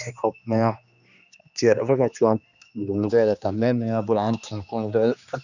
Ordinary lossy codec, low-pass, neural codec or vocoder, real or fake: none; 7.2 kHz; codec, 16 kHz, 2 kbps, X-Codec, HuBERT features, trained on general audio; fake